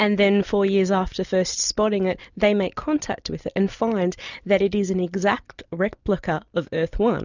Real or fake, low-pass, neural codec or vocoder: real; 7.2 kHz; none